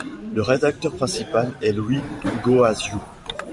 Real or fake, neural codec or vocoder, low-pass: fake; vocoder, 44.1 kHz, 128 mel bands every 512 samples, BigVGAN v2; 10.8 kHz